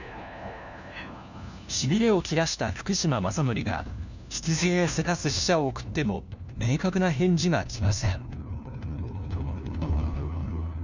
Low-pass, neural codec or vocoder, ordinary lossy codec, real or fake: 7.2 kHz; codec, 16 kHz, 1 kbps, FunCodec, trained on LibriTTS, 50 frames a second; none; fake